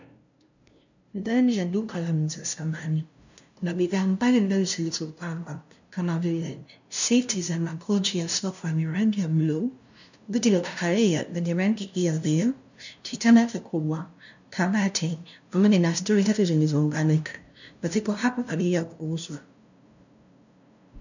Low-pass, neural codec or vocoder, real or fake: 7.2 kHz; codec, 16 kHz, 0.5 kbps, FunCodec, trained on LibriTTS, 25 frames a second; fake